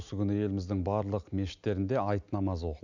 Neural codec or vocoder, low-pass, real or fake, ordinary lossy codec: none; 7.2 kHz; real; none